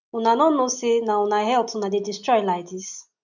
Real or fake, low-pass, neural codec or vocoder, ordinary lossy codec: real; 7.2 kHz; none; none